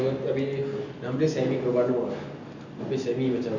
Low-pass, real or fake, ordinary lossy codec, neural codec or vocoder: 7.2 kHz; real; none; none